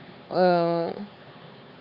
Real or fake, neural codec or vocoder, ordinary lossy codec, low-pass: fake; codec, 16 kHz, 4 kbps, X-Codec, HuBERT features, trained on LibriSpeech; Opus, 64 kbps; 5.4 kHz